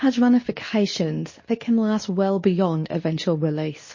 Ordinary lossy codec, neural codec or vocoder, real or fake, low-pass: MP3, 32 kbps; codec, 24 kHz, 0.9 kbps, WavTokenizer, medium speech release version 2; fake; 7.2 kHz